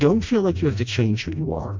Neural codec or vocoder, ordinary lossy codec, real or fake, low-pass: codec, 16 kHz, 1 kbps, FreqCodec, smaller model; MP3, 64 kbps; fake; 7.2 kHz